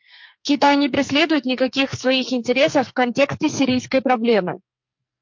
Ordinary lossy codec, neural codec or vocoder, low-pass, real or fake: MP3, 48 kbps; codec, 44.1 kHz, 2.6 kbps, SNAC; 7.2 kHz; fake